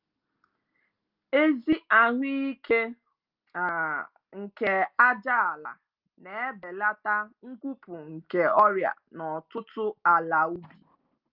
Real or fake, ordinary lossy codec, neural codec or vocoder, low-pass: real; Opus, 24 kbps; none; 5.4 kHz